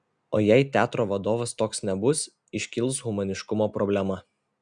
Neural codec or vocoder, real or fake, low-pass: none; real; 9.9 kHz